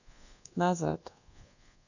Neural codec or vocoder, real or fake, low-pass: codec, 24 kHz, 1.2 kbps, DualCodec; fake; 7.2 kHz